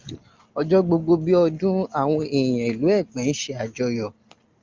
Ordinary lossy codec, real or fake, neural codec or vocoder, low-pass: Opus, 24 kbps; real; none; 7.2 kHz